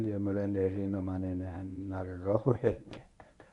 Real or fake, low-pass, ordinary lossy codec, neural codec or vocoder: fake; 10.8 kHz; none; codec, 24 kHz, 0.9 kbps, WavTokenizer, medium speech release version 1